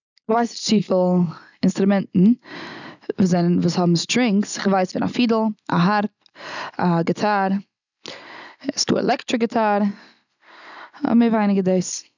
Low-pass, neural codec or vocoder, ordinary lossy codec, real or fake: 7.2 kHz; none; none; real